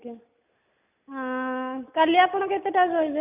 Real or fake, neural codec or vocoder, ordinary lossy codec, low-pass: real; none; none; 3.6 kHz